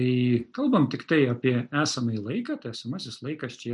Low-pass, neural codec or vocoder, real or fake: 10.8 kHz; none; real